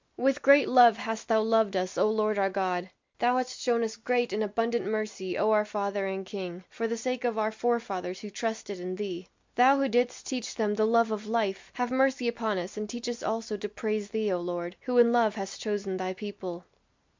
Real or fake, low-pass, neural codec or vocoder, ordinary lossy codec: real; 7.2 kHz; none; MP3, 64 kbps